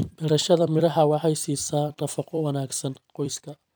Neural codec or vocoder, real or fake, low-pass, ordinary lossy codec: vocoder, 44.1 kHz, 128 mel bands every 256 samples, BigVGAN v2; fake; none; none